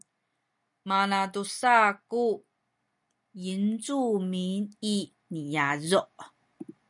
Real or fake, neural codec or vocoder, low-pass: real; none; 10.8 kHz